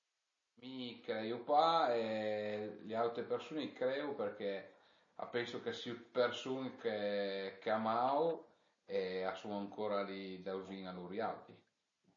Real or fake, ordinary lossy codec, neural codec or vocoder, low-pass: real; MP3, 32 kbps; none; 7.2 kHz